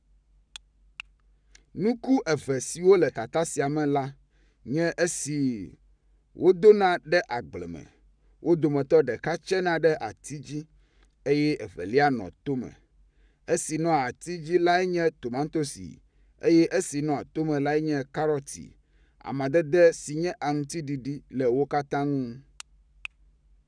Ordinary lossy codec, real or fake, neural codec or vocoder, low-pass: none; fake; codec, 44.1 kHz, 7.8 kbps, Pupu-Codec; 9.9 kHz